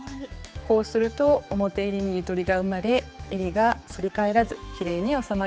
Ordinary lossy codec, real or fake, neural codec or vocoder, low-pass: none; fake; codec, 16 kHz, 4 kbps, X-Codec, HuBERT features, trained on general audio; none